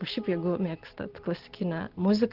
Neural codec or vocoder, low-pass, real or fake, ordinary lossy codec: none; 5.4 kHz; real; Opus, 16 kbps